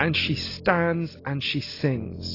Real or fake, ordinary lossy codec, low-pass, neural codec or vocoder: real; AAC, 24 kbps; 5.4 kHz; none